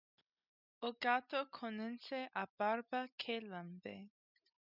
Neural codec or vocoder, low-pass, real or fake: none; 5.4 kHz; real